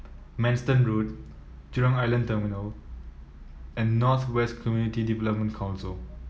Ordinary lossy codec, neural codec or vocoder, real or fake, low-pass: none; none; real; none